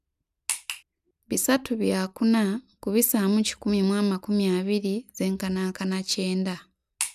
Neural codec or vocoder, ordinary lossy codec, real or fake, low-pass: none; none; real; 14.4 kHz